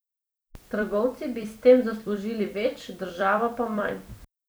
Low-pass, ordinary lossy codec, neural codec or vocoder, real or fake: none; none; vocoder, 44.1 kHz, 128 mel bands every 256 samples, BigVGAN v2; fake